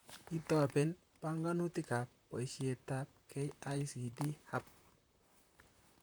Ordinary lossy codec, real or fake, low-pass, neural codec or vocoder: none; fake; none; vocoder, 44.1 kHz, 128 mel bands, Pupu-Vocoder